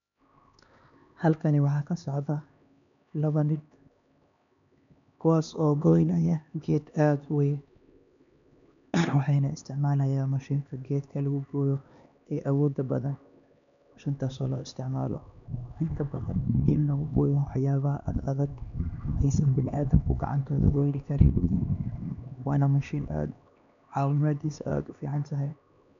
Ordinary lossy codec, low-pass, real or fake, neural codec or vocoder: none; 7.2 kHz; fake; codec, 16 kHz, 2 kbps, X-Codec, HuBERT features, trained on LibriSpeech